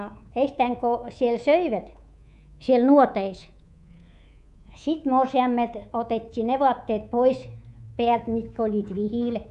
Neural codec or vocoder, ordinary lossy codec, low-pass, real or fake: codec, 24 kHz, 3.1 kbps, DualCodec; none; 10.8 kHz; fake